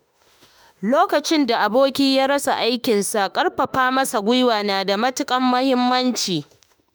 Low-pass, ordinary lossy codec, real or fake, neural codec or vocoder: none; none; fake; autoencoder, 48 kHz, 32 numbers a frame, DAC-VAE, trained on Japanese speech